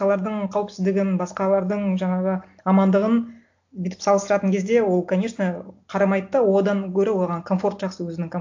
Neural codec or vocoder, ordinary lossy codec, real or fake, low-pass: none; AAC, 48 kbps; real; 7.2 kHz